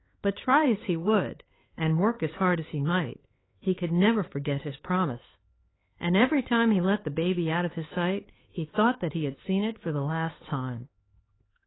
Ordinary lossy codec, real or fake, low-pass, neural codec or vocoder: AAC, 16 kbps; fake; 7.2 kHz; codec, 16 kHz, 4 kbps, X-Codec, HuBERT features, trained on LibriSpeech